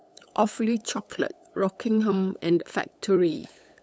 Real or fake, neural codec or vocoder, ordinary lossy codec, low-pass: fake; codec, 16 kHz, 8 kbps, FunCodec, trained on LibriTTS, 25 frames a second; none; none